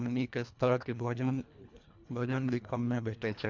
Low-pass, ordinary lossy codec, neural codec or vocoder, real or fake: 7.2 kHz; MP3, 64 kbps; codec, 24 kHz, 1.5 kbps, HILCodec; fake